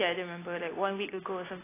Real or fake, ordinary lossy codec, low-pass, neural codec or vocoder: real; AAC, 16 kbps; 3.6 kHz; none